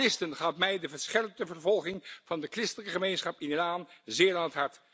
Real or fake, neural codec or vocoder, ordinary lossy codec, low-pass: real; none; none; none